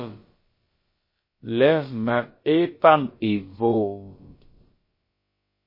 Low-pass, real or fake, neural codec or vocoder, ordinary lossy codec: 5.4 kHz; fake; codec, 16 kHz, about 1 kbps, DyCAST, with the encoder's durations; MP3, 24 kbps